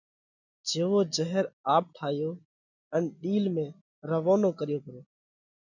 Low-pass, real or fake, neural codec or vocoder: 7.2 kHz; real; none